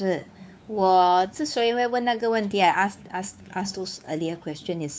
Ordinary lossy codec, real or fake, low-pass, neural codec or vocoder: none; fake; none; codec, 16 kHz, 4 kbps, X-Codec, WavLM features, trained on Multilingual LibriSpeech